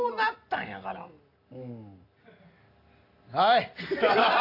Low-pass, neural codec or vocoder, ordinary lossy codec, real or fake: 5.4 kHz; none; AAC, 32 kbps; real